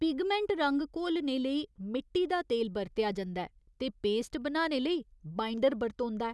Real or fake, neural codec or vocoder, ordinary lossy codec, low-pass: real; none; none; none